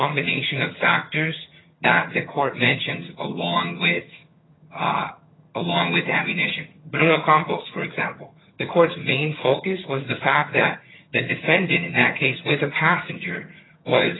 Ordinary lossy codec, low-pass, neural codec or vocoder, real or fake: AAC, 16 kbps; 7.2 kHz; vocoder, 22.05 kHz, 80 mel bands, HiFi-GAN; fake